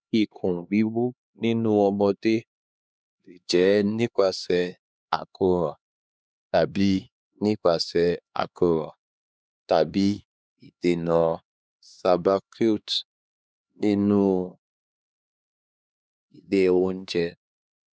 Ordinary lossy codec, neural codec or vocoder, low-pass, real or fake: none; codec, 16 kHz, 2 kbps, X-Codec, HuBERT features, trained on LibriSpeech; none; fake